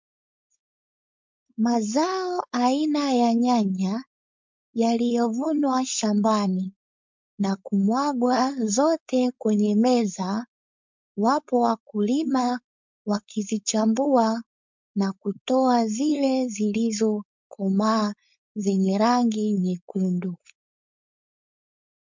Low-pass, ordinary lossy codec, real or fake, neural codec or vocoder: 7.2 kHz; MP3, 64 kbps; fake; codec, 16 kHz, 4.8 kbps, FACodec